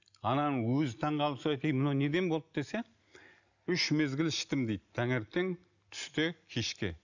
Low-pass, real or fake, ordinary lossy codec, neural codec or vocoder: 7.2 kHz; real; none; none